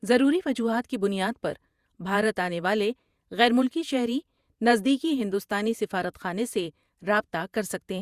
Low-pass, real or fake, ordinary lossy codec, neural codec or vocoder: 14.4 kHz; fake; Opus, 64 kbps; vocoder, 48 kHz, 128 mel bands, Vocos